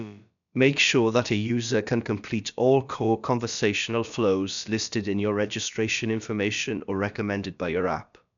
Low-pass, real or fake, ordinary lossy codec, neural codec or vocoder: 7.2 kHz; fake; MP3, 96 kbps; codec, 16 kHz, about 1 kbps, DyCAST, with the encoder's durations